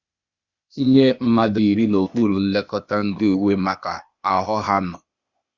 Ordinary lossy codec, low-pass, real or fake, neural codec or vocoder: Opus, 64 kbps; 7.2 kHz; fake; codec, 16 kHz, 0.8 kbps, ZipCodec